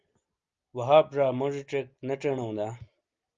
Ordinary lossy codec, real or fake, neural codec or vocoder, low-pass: Opus, 32 kbps; real; none; 7.2 kHz